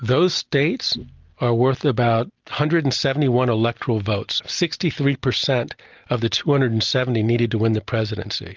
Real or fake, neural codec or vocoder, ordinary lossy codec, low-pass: real; none; Opus, 24 kbps; 7.2 kHz